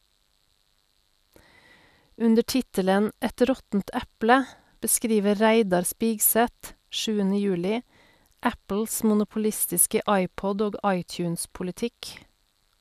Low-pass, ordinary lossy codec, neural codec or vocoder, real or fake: 14.4 kHz; none; none; real